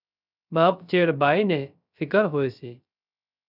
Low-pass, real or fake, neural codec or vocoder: 5.4 kHz; fake; codec, 16 kHz, 0.3 kbps, FocalCodec